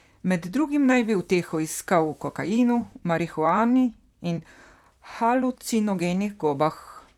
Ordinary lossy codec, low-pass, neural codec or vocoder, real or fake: none; 19.8 kHz; vocoder, 44.1 kHz, 128 mel bands, Pupu-Vocoder; fake